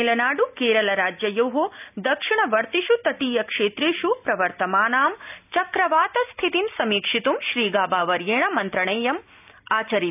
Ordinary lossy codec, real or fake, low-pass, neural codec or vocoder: none; real; 3.6 kHz; none